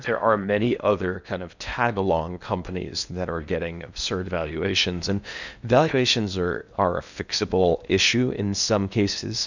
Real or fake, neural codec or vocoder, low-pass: fake; codec, 16 kHz in and 24 kHz out, 0.8 kbps, FocalCodec, streaming, 65536 codes; 7.2 kHz